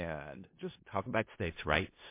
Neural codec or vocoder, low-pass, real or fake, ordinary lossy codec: codec, 16 kHz in and 24 kHz out, 0.4 kbps, LongCat-Audio-Codec, four codebook decoder; 3.6 kHz; fake; MP3, 24 kbps